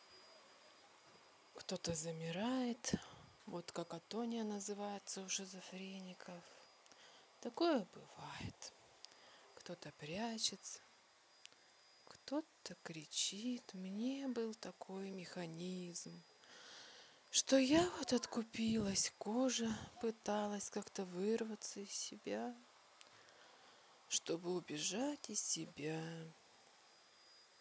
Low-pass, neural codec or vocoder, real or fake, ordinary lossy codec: none; none; real; none